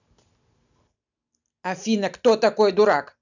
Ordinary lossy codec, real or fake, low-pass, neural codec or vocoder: none; real; 7.2 kHz; none